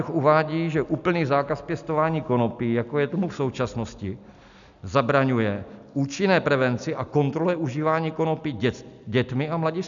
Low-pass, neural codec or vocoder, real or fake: 7.2 kHz; none; real